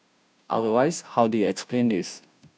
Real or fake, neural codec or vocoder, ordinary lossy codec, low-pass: fake; codec, 16 kHz, 0.5 kbps, FunCodec, trained on Chinese and English, 25 frames a second; none; none